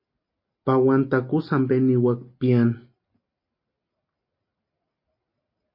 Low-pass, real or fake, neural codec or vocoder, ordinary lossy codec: 5.4 kHz; real; none; MP3, 32 kbps